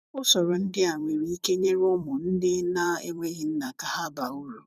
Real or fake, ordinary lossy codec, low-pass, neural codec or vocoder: fake; none; 14.4 kHz; vocoder, 44.1 kHz, 128 mel bands every 256 samples, BigVGAN v2